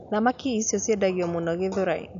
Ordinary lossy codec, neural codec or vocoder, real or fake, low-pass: MP3, 64 kbps; none; real; 7.2 kHz